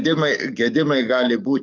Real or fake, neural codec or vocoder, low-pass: fake; codec, 44.1 kHz, 7.8 kbps, DAC; 7.2 kHz